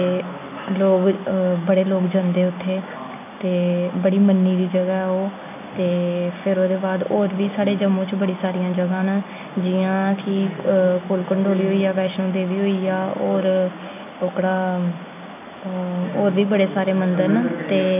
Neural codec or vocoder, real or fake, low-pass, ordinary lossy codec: none; real; 3.6 kHz; none